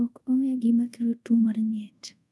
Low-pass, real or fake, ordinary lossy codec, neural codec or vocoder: none; fake; none; codec, 24 kHz, 0.5 kbps, DualCodec